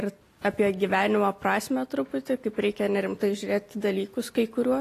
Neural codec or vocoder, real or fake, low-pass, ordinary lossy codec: none; real; 14.4 kHz; AAC, 48 kbps